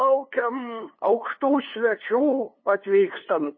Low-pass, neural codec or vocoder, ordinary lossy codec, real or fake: 7.2 kHz; codec, 16 kHz, 2 kbps, FunCodec, trained on LibriTTS, 25 frames a second; MP3, 24 kbps; fake